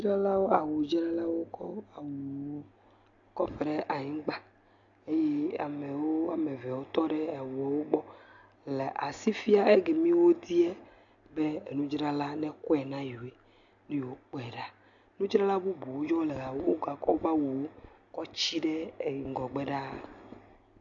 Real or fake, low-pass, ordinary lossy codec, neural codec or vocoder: real; 7.2 kHz; AAC, 64 kbps; none